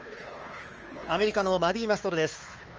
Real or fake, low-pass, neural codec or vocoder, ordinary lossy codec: fake; 7.2 kHz; codec, 16 kHz, 4 kbps, X-Codec, WavLM features, trained on Multilingual LibriSpeech; Opus, 24 kbps